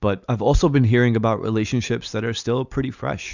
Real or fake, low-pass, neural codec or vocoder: real; 7.2 kHz; none